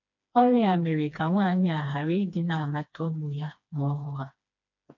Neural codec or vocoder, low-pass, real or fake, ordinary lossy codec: codec, 16 kHz, 2 kbps, FreqCodec, smaller model; 7.2 kHz; fake; none